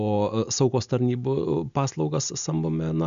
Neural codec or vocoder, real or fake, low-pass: none; real; 7.2 kHz